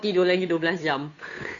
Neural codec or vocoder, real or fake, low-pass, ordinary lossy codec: codec, 16 kHz, 2 kbps, FunCodec, trained on Chinese and English, 25 frames a second; fake; 7.2 kHz; none